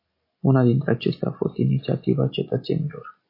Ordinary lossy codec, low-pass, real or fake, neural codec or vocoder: AAC, 32 kbps; 5.4 kHz; real; none